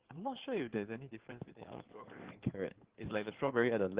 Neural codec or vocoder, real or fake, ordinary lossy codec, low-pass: codec, 16 kHz in and 24 kHz out, 2.2 kbps, FireRedTTS-2 codec; fake; Opus, 16 kbps; 3.6 kHz